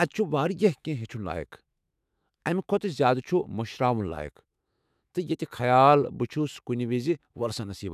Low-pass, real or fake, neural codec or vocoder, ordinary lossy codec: 14.4 kHz; fake; autoencoder, 48 kHz, 128 numbers a frame, DAC-VAE, trained on Japanese speech; none